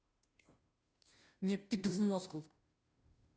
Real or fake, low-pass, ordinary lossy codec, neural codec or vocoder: fake; none; none; codec, 16 kHz, 0.5 kbps, FunCodec, trained on Chinese and English, 25 frames a second